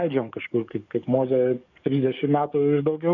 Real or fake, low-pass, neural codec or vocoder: fake; 7.2 kHz; codec, 44.1 kHz, 7.8 kbps, DAC